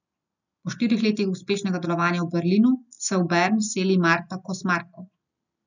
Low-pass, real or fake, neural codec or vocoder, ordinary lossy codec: 7.2 kHz; real; none; none